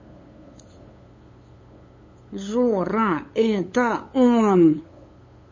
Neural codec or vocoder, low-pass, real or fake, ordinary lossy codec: codec, 16 kHz, 8 kbps, FunCodec, trained on LibriTTS, 25 frames a second; 7.2 kHz; fake; MP3, 32 kbps